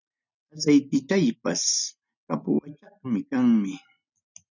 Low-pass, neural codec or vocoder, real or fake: 7.2 kHz; none; real